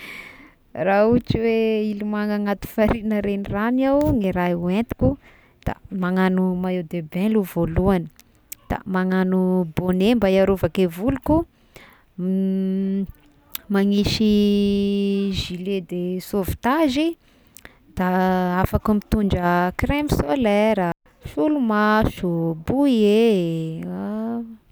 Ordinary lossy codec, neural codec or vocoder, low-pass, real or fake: none; none; none; real